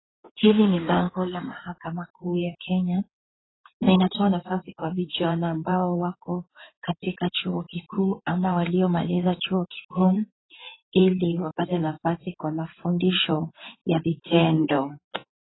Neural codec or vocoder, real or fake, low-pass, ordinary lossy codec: codec, 16 kHz in and 24 kHz out, 2.2 kbps, FireRedTTS-2 codec; fake; 7.2 kHz; AAC, 16 kbps